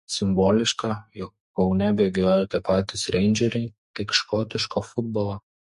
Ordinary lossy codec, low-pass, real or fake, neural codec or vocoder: MP3, 48 kbps; 14.4 kHz; fake; codec, 44.1 kHz, 2.6 kbps, SNAC